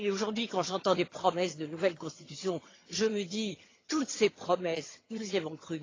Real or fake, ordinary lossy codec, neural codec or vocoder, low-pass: fake; AAC, 32 kbps; vocoder, 22.05 kHz, 80 mel bands, HiFi-GAN; 7.2 kHz